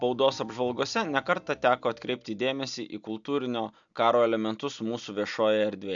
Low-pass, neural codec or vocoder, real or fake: 7.2 kHz; none; real